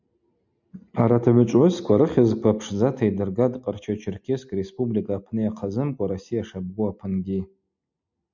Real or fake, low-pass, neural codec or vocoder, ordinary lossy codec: real; 7.2 kHz; none; MP3, 64 kbps